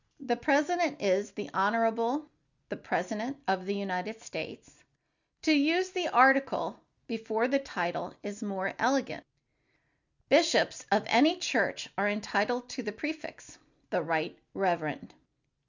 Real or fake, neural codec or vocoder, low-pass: real; none; 7.2 kHz